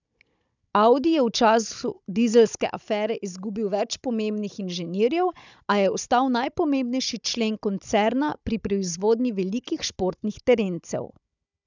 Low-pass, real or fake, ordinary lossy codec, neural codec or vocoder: 7.2 kHz; fake; none; codec, 16 kHz, 16 kbps, FunCodec, trained on Chinese and English, 50 frames a second